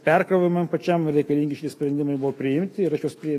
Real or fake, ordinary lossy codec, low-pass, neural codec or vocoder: fake; AAC, 48 kbps; 14.4 kHz; autoencoder, 48 kHz, 128 numbers a frame, DAC-VAE, trained on Japanese speech